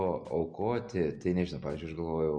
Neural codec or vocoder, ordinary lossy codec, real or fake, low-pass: none; MP3, 48 kbps; real; 9.9 kHz